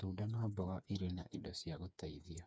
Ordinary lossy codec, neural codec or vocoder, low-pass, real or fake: none; codec, 16 kHz, 4 kbps, FreqCodec, smaller model; none; fake